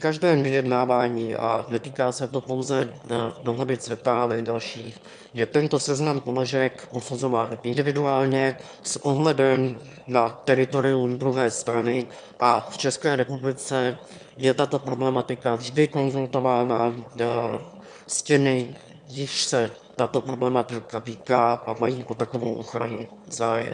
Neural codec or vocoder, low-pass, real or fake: autoencoder, 22.05 kHz, a latent of 192 numbers a frame, VITS, trained on one speaker; 9.9 kHz; fake